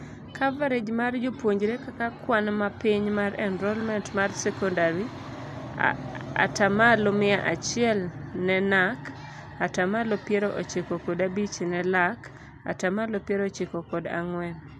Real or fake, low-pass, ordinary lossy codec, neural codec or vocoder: real; none; none; none